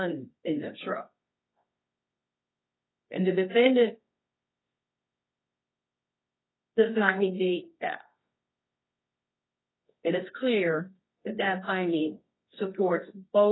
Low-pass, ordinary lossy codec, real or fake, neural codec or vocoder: 7.2 kHz; AAC, 16 kbps; fake; codec, 24 kHz, 0.9 kbps, WavTokenizer, medium music audio release